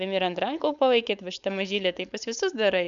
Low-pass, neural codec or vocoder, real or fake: 7.2 kHz; codec, 16 kHz, 4.8 kbps, FACodec; fake